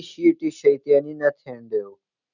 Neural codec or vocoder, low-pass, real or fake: none; 7.2 kHz; real